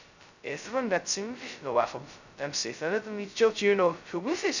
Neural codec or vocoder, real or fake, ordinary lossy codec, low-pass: codec, 16 kHz, 0.2 kbps, FocalCodec; fake; none; 7.2 kHz